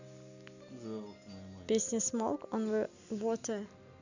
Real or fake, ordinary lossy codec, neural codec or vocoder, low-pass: real; none; none; 7.2 kHz